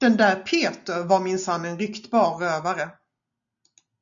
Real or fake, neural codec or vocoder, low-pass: real; none; 7.2 kHz